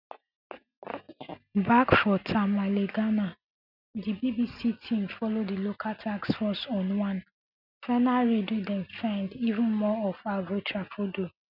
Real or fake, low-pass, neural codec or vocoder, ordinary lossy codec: real; 5.4 kHz; none; none